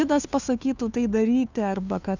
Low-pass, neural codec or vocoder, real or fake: 7.2 kHz; codec, 16 kHz, 2 kbps, FunCodec, trained on Chinese and English, 25 frames a second; fake